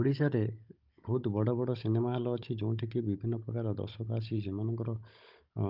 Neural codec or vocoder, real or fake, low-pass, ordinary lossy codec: codec, 16 kHz, 16 kbps, FunCodec, trained on Chinese and English, 50 frames a second; fake; 5.4 kHz; Opus, 24 kbps